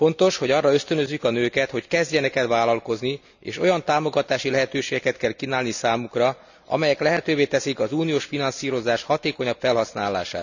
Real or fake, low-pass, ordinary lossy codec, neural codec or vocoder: real; 7.2 kHz; none; none